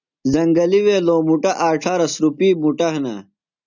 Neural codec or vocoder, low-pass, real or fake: none; 7.2 kHz; real